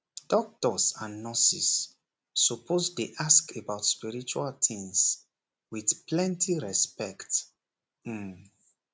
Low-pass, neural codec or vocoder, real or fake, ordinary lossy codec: none; none; real; none